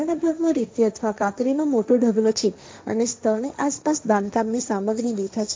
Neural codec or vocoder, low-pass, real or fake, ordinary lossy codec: codec, 16 kHz, 1.1 kbps, Voila-Tokenizer; none; fake; none